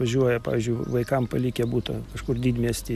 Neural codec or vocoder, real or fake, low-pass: none; real; 14.4 kHz